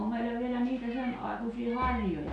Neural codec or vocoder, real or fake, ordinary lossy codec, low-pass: none; real; none; 10.8 kHz